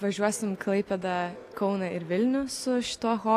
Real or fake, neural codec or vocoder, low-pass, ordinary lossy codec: real; none; 14.4 kHz; AAC, 64 kbps